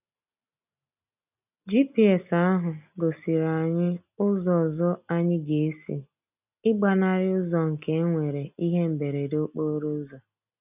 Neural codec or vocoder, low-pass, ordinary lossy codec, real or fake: none; 3.6 kHz; none; real